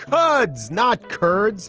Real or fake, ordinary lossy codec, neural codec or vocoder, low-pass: real; Opus, 16 kbps; none; 7.2 kHz